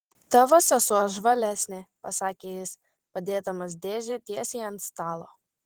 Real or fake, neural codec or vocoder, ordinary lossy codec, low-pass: fake; vocoder, 44.1 kHz, 128 mel bands every 512 samples, BigVGAN v2; Opus, 16 kbps; 19.8 kHz